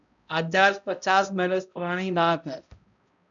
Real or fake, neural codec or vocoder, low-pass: fake; codec, 16 kHz, 0.5 kbps, X-Codec, HuBERT features, trained on balanced general audio; 7.2 kHz